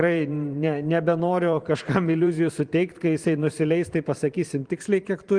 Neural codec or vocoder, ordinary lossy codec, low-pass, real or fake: vocoder, 44.1 kHz, 128 mel bands every 512 samples, BigVGAN v2; Opus, 24 kbps; 9.9 kHz; fake